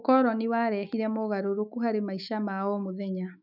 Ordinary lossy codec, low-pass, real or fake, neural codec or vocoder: none; 5.4 kHz; fake; autoencoder, 48 kHz, 128 numbers a frame, DAC-VAE, trained on Japanese speech